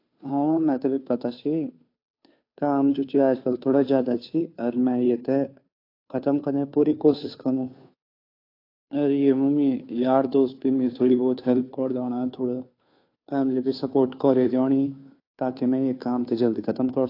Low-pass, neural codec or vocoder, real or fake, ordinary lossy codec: 5.4 kHz; codec, 16 kHz, 2 kbps, FunCodec, trained on Chinese and English, 25 frames a second; fake; AAC, 32 kbps